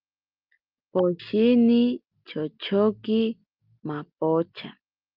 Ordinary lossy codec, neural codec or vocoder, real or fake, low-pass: Opus, 24 kbps; none; real; 5.4 kHz